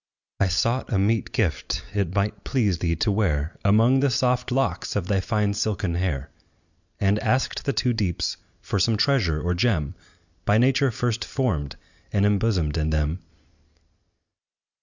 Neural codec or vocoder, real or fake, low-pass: none; real; 7.2 kHz